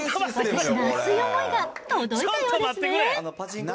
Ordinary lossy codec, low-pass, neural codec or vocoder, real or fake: none; none; none; real